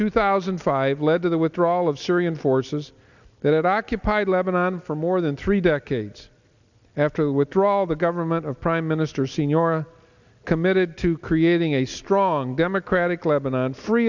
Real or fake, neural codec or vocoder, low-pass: real; none; 7.2 kHz